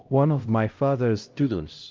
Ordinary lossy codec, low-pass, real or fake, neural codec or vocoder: Opus, 24 kbps; 7.2 kHz; fake; codec, 16 kHz, 0.5 kbps, X-Codec, HuBERT features, trained on LibriSpeech